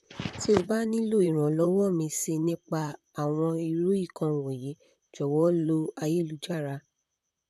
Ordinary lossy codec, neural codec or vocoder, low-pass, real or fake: none; vocoder, 44.1 kHz, 128 mel bands, Pupu-Vocoder; 14.4 kHz; fake